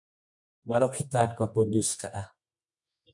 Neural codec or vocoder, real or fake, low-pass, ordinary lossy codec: codec, 24 kHz, 0.9 kbps, WavTokenizer, medium music audio release; fake; 10.8 kHz; MP3, 96 kbps